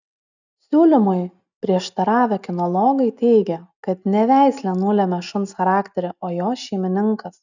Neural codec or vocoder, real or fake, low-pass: none; real; 7.2 kHz